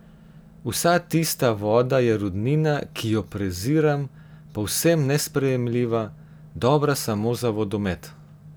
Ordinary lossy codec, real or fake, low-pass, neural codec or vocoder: none; real; none; none